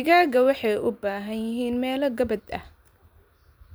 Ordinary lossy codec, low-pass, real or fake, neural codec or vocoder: none; none; fake; vocoder, 44.1 kHz, 128 mel bands every 512 samples, BigVGAN v2